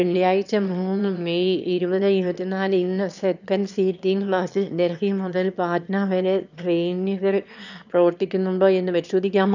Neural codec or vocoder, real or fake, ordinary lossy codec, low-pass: autoencoder, 22.05 kHz, a latent of 192 numbers a frame, VITS, trained on one speaker; fake; none; 7.2 kHz